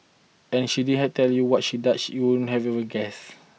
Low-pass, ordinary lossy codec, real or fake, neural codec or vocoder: none; none; real; none